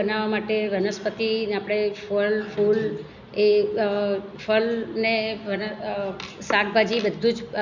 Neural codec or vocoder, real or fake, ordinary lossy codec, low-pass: none; real; none; 7.2 kHz